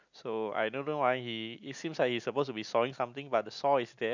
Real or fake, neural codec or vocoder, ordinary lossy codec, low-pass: real; none; none; 7.2 kHz